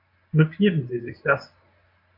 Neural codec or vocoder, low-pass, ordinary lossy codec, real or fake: codec, 24 kHz, 0.9 kbps, WavTokenizer, medium speech release version 1; 5.4 kHz; MP3, 32 kbps; fake